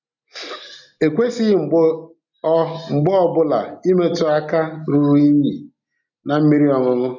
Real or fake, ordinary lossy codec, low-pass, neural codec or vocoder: real; none; 7.2 kHz; none